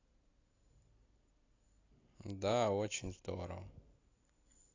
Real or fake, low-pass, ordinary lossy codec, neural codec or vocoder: real; 7.2 kHz; AAC, 32 kbps; none